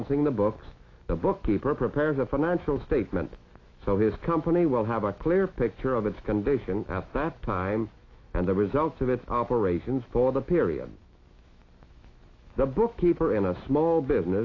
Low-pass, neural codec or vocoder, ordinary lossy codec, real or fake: 7.2 kHz; autoencoder, 48 kHz, 128 numbers a frame, DAC-VAE, trained on Japanese speech; AAC, 32 kbps; fake